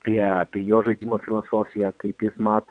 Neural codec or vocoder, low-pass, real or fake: vocoder, 22.05 kHz, 80 mel bands, WaveNeXt; 9.9 kHz; fake